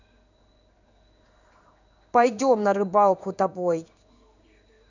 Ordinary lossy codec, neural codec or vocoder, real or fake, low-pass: none; codec, 16 kHz in and 24 kHz out, 1 kbps, XY-Tokenizer; fake; 7.2 kHz